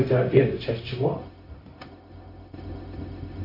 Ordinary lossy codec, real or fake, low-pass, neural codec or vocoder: MP3, 32 kbps; fake; 5.4 kHz; codec, 16 kHz, 0.4 kbps, LongCat-Audio-Codec